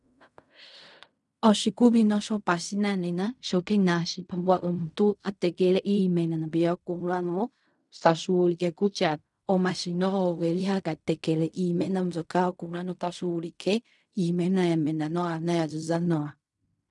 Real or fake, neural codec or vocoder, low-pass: fake; codec, 16 kHz in and 24 kHz out, 0.4 kbps, LongCat-Audio-Codec, fine tuned four codebook decoder; 10.8 kHz